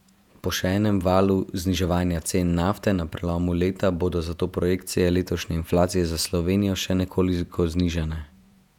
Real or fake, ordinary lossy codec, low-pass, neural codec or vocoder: fake; none; 19.8 kHz; vocoder, 48 kHz, 128 mel bands, Vocos